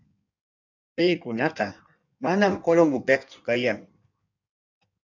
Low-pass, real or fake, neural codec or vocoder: 7.2 kHz; fake; codec, 16 kHz in and 24 kHz out, 1.1 kbps, FireRedTTS-2 codec